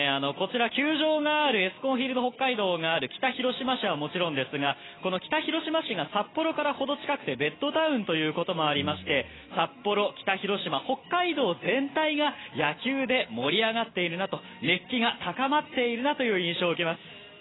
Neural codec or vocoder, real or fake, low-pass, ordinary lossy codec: none; real; 7.2 kHz; AAC, 16 kbps